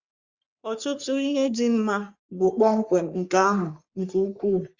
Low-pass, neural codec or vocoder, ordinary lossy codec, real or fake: 7.2 kHz; codec, 44.1 kHz, 3.4 kbps, Pupu-Codec; Opus, 64 kbps; fake